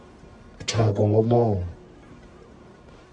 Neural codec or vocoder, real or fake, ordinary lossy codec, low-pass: codec, 44.1 kHz, 1.7 kbps, Pupu-Codec; fake; AAC, 64 kbps; 10.8 kHz